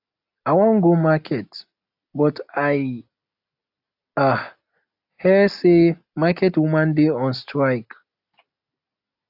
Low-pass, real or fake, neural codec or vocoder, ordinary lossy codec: 5.4 kHz; real; none; AAC, 48 kbps